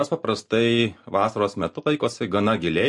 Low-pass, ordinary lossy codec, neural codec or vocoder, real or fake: 10.8 kHz; MP3, 48 kbps; none; real